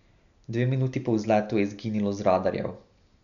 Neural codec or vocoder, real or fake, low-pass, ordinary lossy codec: none; real; 7.2 kHz; none